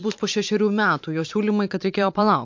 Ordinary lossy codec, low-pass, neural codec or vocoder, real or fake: MP3, 48 kbps; 7.2 kHz; vocoder, 44.1 kHz, 128 mel bands every 512 samples, BigVGAN v2; fake